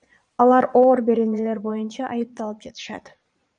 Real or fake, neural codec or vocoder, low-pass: fake; vocoder, 22.05 kHz, 80 mel bands, Vocos; 9.9 kHz